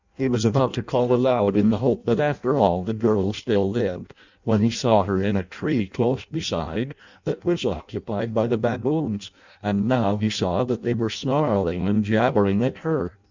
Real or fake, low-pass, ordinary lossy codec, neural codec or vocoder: fake; 7.2 kHz; Opus, 64 kbps; codec, 16 kHz in and 24 kHz out, 0.6 kbps, FireRedTTS-2 codec